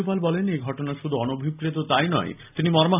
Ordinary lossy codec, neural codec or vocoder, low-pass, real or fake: none; none; 3.6 kHz; real